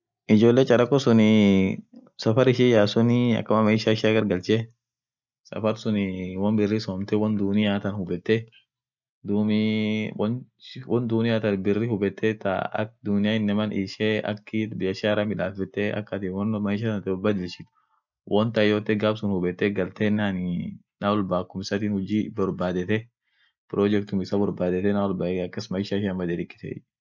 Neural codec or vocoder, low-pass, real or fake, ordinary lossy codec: none; 7.2 kHz; real; none